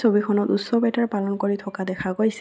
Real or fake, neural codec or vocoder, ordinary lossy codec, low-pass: real; none; none; none